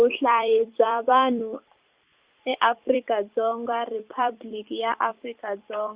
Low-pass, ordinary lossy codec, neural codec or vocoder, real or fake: 3.6 kHz; Opus, 64 kbps; vocoder, 44.1 kHz, 128 mel bands every 256 samples, BigVGAN v2; fake